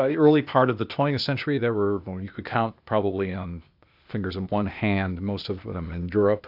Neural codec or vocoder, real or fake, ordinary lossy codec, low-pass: codec, 16 kHz, 0.8 kbps, ZipCodec; fake; AAC, 48 kbps; 5.4 kHz